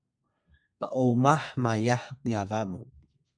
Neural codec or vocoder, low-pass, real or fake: codec, 32 kHz, 1.9 kbps, SNAC; 9.9 kHz; fake